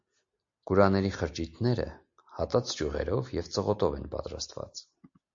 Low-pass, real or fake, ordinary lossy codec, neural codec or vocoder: 7.2 kHz; real; MP3, 48 kbps; none